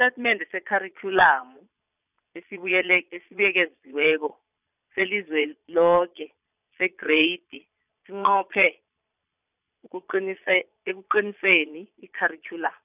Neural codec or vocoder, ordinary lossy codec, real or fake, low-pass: vocoder, 22.05 kHz, 80 mel bands, Vocos; none; fake; 3.6 kHz